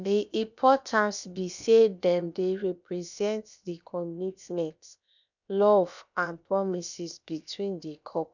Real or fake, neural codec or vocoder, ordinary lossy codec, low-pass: fake; codec, 16 kHz, about 1 kbps, DyCAST, with the encoder's durations; none; 7.2 kHz